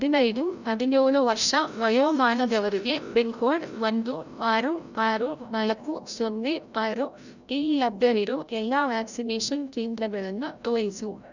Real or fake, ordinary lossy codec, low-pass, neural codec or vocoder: fake; none; 7.2 kHz; codec, 16 kHz, 0.5 kbps, FreqCodec, larger model